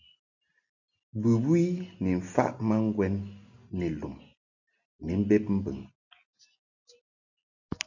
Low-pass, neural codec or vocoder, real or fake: 7.2 kHz; none; real